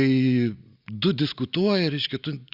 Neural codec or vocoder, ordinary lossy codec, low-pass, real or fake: none; Opus, 64 kbps; 5.4 kHz; real